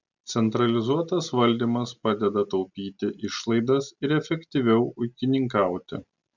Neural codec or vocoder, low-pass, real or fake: none; 7.2 kHz; real